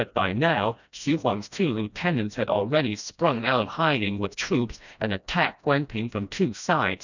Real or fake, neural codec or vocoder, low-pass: fake; codec, 16 kHz, 1 kbps, FreqCodec, smaller model; 7.2 kHz